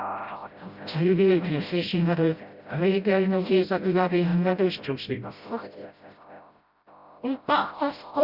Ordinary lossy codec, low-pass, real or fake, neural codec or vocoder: Opus, 64 kbps; 5.4 kHz; fake; codec, 16 kHz, 0.5 kbps, FreqCodec, smaller model